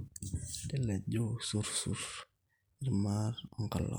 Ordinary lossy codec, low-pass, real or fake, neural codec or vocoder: none; none; real; none